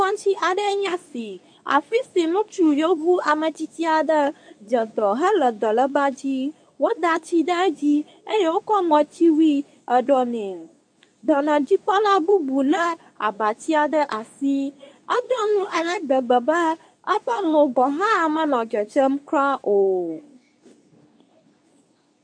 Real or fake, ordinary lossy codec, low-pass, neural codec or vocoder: fake; MP3, 64 kbps; 9.9 kHz; codec, 24 kHz, 0.9 kbps, WavTokenizer, medium speech release version 2